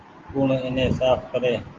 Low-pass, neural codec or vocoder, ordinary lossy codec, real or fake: 7.2 kHz; none; Opus, 16 kbps; real